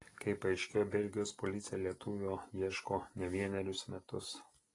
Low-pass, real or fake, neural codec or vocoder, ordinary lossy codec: 10.8 kHz; real; none; AAC, 32 kbps